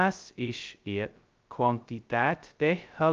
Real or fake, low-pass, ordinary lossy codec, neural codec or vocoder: fake; 7.2 kHz; Opus, 24 kbps; codec, 16 kHz, 0.2 kbps, FocalCodec